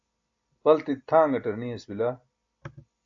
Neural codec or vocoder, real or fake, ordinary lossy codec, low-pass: none; real; AAC, 64 kbps; 7.2 kHz